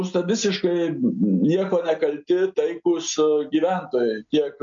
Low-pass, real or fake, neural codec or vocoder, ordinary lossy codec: 7.2 kHz; real; none; MP3, 48 kbps